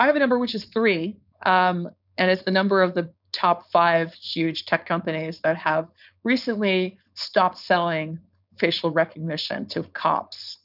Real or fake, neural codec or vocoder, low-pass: fake; codec, 16 kHz, 4.8 kbps, FACodec; 5.4 kHz